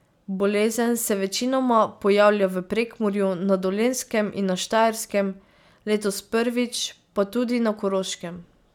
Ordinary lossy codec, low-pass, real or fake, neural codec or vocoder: none; 19.8 kHz; real; none